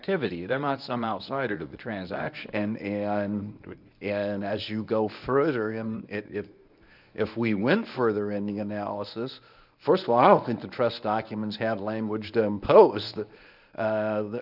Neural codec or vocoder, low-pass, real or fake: codec, 24 kHz, 0.9 kbps, WavTokenizer, medium speech release version 1; 5.4 kHz; fake